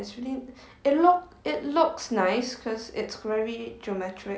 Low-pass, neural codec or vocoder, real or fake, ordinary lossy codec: none; none; real; none